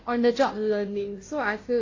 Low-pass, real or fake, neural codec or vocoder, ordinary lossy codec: 7.2 kHz; fake; codec, 16 kHz, 0.5 kbps, FunCodec, trained on Chinese and English, 25 frames a second; AAC, 32 kbps